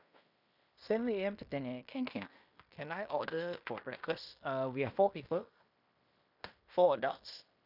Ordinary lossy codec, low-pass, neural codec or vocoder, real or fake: none; 5.4 kHz; codec, 16 kHz in and 24 kHz out, 0.9 kbps, LongCat-Audio-Codec, fine tuned four codebook decoder; fake